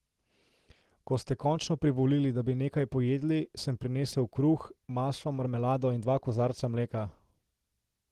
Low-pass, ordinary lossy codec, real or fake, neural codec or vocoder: 14.4 kHz; Opus, 16 kbps; real; none